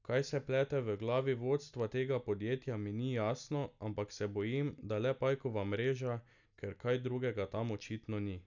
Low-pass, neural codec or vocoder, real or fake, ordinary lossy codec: 7.2 kHz; none; real; none